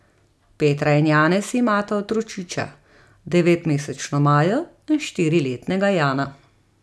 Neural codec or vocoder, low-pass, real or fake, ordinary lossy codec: none; none; real; none